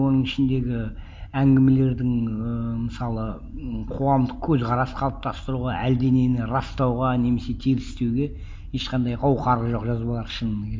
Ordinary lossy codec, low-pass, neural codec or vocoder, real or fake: none; none; none; real